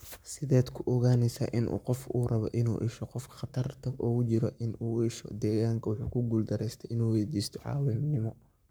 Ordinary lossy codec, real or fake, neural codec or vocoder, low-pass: none; fake; codec, 44.1 kHz, 7.8 kbps, Pupu-Codec; none